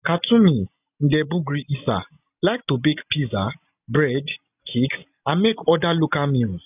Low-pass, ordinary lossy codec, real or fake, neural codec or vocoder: 3.6 kHz; none; real; none